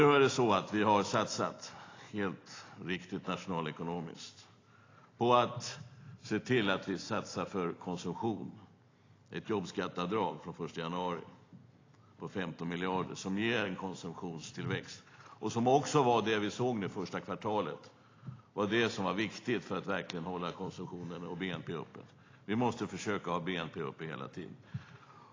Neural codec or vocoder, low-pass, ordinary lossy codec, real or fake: none; 7.2 kHz; AAC, 32 kbps; real